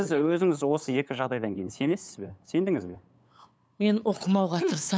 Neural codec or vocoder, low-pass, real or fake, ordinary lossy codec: codec, 16 kHz, 8 kbps, FunCodec, trained on LibriTTS, 25 frames a second; none; fake; none